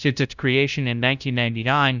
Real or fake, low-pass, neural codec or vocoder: fake; 7.2 kHz; codec, 16 kHz, 0.5 kbps, FunCodec, trained on LibriTTS, 25 frames a second